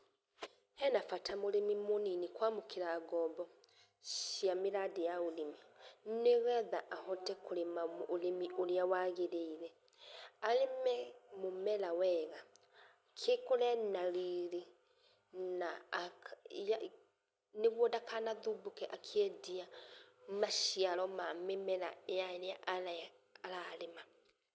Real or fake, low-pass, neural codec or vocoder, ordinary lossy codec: real; none; none; none